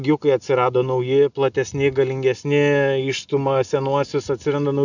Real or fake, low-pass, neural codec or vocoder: real; 7.2 kHz; none